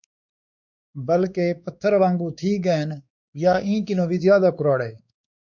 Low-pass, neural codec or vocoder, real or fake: 7.2 kHz; codec, 16 kHz, 4 kbps, X-Codec, WavLM features, trained on Multilingual LibriSpeech; fake